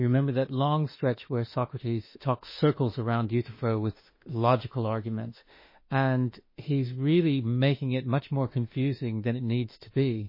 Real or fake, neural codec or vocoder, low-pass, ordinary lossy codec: fake; autoencoder, 48 kHz, 32 numbers a frame, DAC-VAE, trained on Japanese speech; 5.4 kHz; MP3, 24 kbps